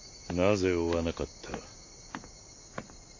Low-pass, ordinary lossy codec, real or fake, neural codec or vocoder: 7.2 kHz; AAC, 48 kbps; real; none